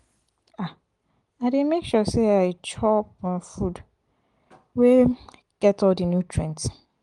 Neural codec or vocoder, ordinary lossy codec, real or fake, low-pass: none; Opus, 24 kbps; real; 10.8 kHz